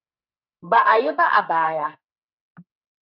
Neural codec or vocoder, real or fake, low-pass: codec, 44.1 kHz, 2.6 kbps, SNAC; fake; 5.4 kHz